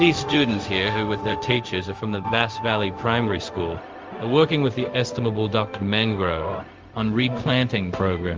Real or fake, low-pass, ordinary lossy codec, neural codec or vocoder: fake; 7.2 kHz; Opus, 32 kbps; codec, 16 kHz, 0.4 kbps, LongCat-Audio-Codec